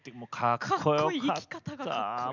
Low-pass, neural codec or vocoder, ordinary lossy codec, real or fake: 7.2 kHz; none; none; real